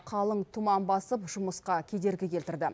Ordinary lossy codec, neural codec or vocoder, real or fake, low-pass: none; none; real; none